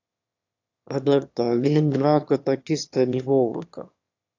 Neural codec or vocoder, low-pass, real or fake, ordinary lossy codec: autoencoder, 22.05 kHz, a latent of 192 numbers a frame, VITS, trained on one speaker; 7.2 kHz; fake; AAC, 48 kbps